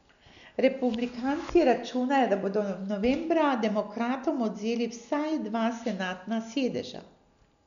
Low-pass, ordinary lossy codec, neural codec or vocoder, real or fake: 7.2 kHz; none; none; real